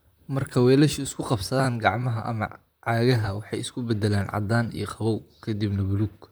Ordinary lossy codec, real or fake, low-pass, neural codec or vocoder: none; fake; none; vocoder, 44.1 kHz, 128 mel bands, Pupu-Vocoder